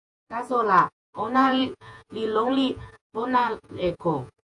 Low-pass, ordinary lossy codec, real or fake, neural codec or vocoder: 10.8 kHz; AAC, 64 kbps; fake; vocoder, 48 kHz, 128 mel bands, Vocos